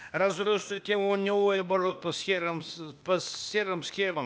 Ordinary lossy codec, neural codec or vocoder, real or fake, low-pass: none; codec, 16 kHz, 0.8 kbps, ZipCodec; fake; none